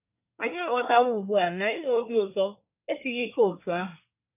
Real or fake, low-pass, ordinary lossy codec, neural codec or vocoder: fake; 3.6 kHz; none; codec, 24 kHz, 1 kbps, SNAC